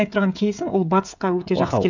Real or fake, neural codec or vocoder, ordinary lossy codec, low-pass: fake; codec, 16 kHz, 16 kbps, FreqCodec, smaller model; none; 7.2 kHz